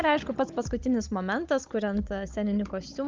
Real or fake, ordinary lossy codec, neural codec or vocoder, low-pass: fake; Opus, 24 kbps; codec, 16 kHz, 16 kbps, FunCodec, trained on Chinese and English, 50 frames a second; 7.2 kHz